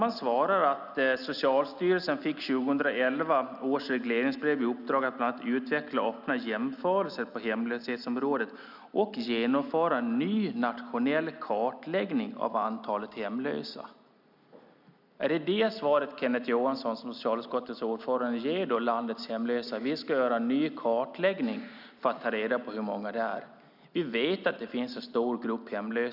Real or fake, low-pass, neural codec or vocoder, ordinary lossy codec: real; 5.4 kHz; none; none